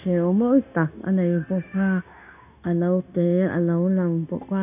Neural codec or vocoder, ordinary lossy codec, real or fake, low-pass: codec, 16 kHz, 0.9 kbps, LongCat-Audio-Codec; none; fake; 3.6 kHz